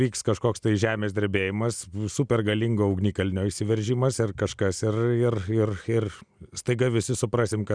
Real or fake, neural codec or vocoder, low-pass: real; none; 9.9 kHz